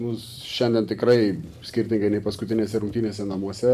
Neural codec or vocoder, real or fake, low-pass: vocoder, 44.1 kHz, 128 mel bands every 512 samples, BigVGAN v2; fake; 14.4 kHz